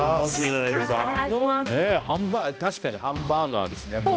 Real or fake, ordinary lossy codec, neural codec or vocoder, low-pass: fake; none; codec, 16 kHz, 1 kbps, X-Codec, HuBERT features, trained on balanced general audio; none